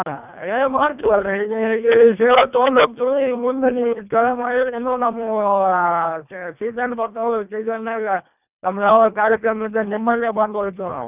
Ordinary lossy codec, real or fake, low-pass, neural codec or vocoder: none; fake; 3.6 kHz; codec, 24 kHz, 1.5 kbps, HILCodec